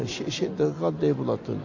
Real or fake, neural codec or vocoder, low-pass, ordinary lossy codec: real; none; 7.2 kHz; AAC, 48 kbps